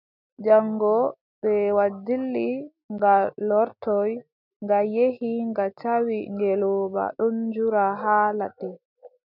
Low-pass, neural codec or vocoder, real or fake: 5.4 kHz; none; real